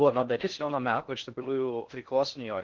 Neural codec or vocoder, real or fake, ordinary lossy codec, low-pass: codec, 16 kHz in and 24 kHz out, 0.6 kbps, FocalCodec, streaming, 4096 codes; fake; Opus, 16 kbps; 7.2 kHz